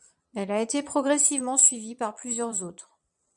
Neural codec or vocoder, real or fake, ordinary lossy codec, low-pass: vocoder, 22.05 kHz, 80 mel bands, Vocos; fake; MP3, 96 kbps; 9.9 kHz